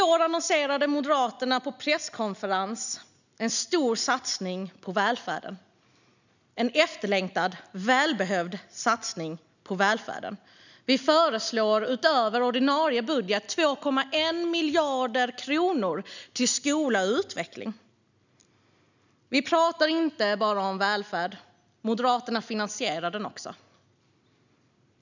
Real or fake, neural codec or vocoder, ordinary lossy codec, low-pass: real; none; none; 7.2 kHz